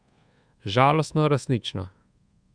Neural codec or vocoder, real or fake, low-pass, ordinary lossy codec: codec, 24 kHz, 1.2 kbps, DualCodec; fake; 9.9 kHz; Opus, 64 kbps